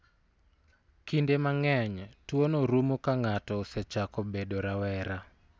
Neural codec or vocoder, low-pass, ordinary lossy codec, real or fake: none; none; none; real